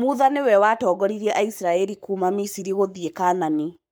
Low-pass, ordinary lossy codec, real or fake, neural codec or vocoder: none; none; fake; codec, 44.1 kHz, 7.8 kbps, Pupu-Codec